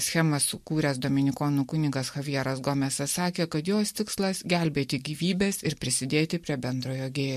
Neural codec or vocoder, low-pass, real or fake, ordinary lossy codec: none; 14.4 kHz; real; MP3, 64 kbps